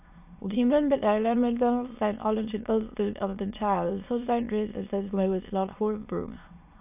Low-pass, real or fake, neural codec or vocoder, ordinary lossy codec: 3.6 kHz; fake; autoencoder, 22.05 kHz, a latent of 192 numbers a frame, VITS, trained on many speakers; AAC, 32 kbps